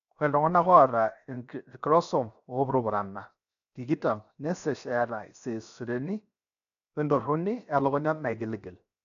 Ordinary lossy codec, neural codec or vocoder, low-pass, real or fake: none; codec, 16 kHz, 0.7 kbps, FocalCodec; 7.2 kHz; fake